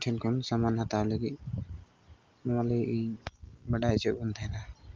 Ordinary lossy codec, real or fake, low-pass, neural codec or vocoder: Opus, 32 kbps; real; 7.2 kHz; none